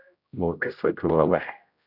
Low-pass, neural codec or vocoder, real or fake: 5.4 kHz; codec, 16 kHz, 0.5 kbps, X-Codec, HuBERT features, trained on general audio; fake